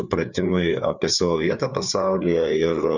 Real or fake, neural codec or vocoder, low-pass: fake; codec, 16 kHz, 4 kbps, FreqCodec, larger model; 7.2 kHz